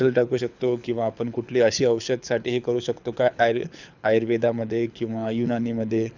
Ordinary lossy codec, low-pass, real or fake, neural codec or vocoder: none; 7.2 kHz; fake; codec, 24 kHz, 6 kbps, HILCodec